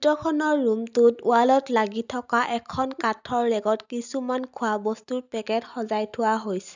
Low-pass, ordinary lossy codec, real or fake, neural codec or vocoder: 7.2 kHz; none; real; none